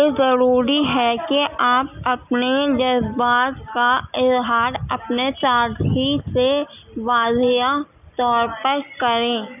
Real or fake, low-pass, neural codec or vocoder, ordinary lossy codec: real; 3.6 kHz; none; none